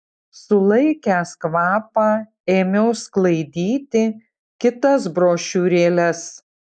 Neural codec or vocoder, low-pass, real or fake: none; 9.9 kHz; real